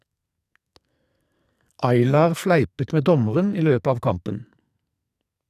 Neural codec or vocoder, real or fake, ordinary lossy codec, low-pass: codec, 44.1 kHz, 2.6 kbps, SNAC; fake; none; 14.4 kHz